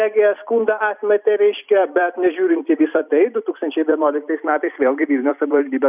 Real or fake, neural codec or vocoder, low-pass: fake; vocoder, 44.1 kHz, 128 mel bands every 256 samples, BigVGAN v2; 3.6 kHz